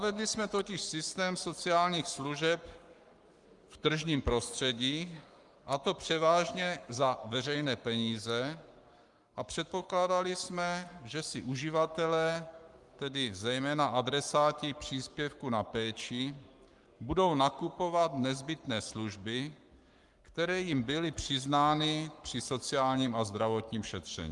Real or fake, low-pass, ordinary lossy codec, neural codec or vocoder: fake; 10.8 kHz; Opus, 32 kbps; codec, 44.1 kHz, 7.8 kbps, Pupu-Codec